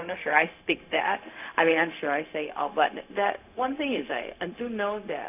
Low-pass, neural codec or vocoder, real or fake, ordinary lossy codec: 3.6 kHz; codec, 16 kHz, 0.4 kbps, LongCat-Audio-Codec; fake; AAC, 32 kbps